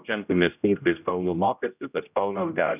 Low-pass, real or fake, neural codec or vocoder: 3.6 kHz; fake; codec, 16 kHz, 0.5 kbps, X-Codec, HuBERT features, trained on general audio